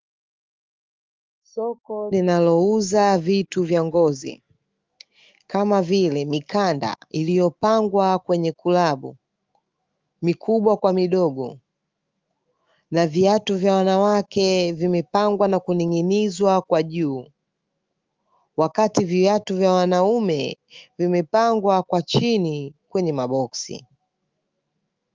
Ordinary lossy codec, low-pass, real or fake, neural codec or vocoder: Opus, 32 kbps; 7.2 kHz; fake; autoencoder, 48 kHz, 128 numbers a frame, DAC-VAE, trained on Japanese speech